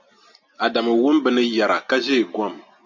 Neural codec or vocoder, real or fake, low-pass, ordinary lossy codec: none; real; 7.2 kHz; MP3, 64 kbps